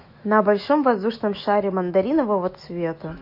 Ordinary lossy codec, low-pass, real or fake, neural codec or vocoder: MP3, 32 kbps; 5.4 kHz; fake; autoencoder, 48 kHz, 128 numbers a frame, DAC-VAE, trained on Japanese speech